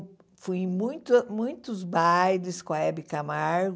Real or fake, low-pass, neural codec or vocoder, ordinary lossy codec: real; none; none; none